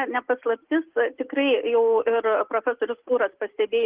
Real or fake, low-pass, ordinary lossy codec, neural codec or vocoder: real; 3.6 kHz; Opus, 16 kbps; none